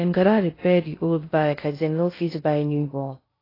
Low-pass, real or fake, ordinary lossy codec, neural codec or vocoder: 5.4 kHz; fake; AAC, 24 kbps; codec, 16 kHz in and 24 kHz out, 0.6 kbps, FocalCodec, streaming, 2048 codes